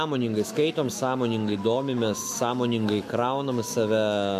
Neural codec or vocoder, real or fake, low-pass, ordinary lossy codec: autoencoder, 48 kHz, 128 numbers a frame, DAC-VAE, trained on Japanese speech; fake; 14.4 kHz; MP3, 64 kbps